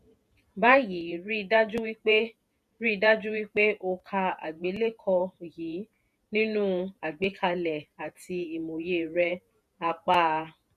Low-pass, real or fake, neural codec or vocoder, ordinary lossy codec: 14.4 kHz; fake; vocoder, 48 kHz, 128 mel bands, Vocos; none